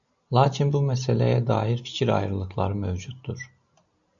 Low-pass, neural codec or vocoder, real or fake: 7.2 kHz; none; real